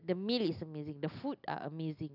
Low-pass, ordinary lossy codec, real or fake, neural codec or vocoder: 5.4 kHz; none; real; none